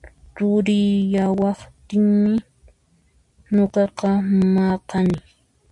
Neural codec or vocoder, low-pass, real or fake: none; 10.8 kHz; real